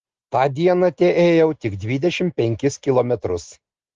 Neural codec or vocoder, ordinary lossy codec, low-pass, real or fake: none; Opus, 16 kbps; 7.2 kHz; real